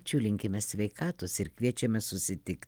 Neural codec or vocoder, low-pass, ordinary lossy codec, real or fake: none; 19.8 kHz; Opus, 24 kbps; real